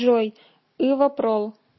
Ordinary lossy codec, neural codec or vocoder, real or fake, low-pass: MP3, 24 kbps; none; real; 7.2 kHz